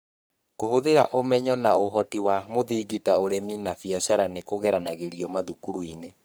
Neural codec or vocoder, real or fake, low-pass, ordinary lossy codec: codec, 44.1 kHz, 3.4 kbps, Pupu-Codec; fake; none; none